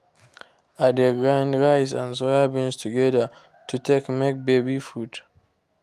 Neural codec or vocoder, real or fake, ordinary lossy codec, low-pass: autoencoder, 48 kHz, 128 numbers a frame, DAC-VAE, trained on Japanese speech; fake; Opus, 32 kbps; 14.4 kHz